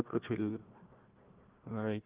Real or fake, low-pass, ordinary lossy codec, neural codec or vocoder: fake; 3.6 kHz; Opus, 16 kbps; codec, 16 kHz, 1 kbps, FunCodec, trained on Chinese and English, 50 frames a second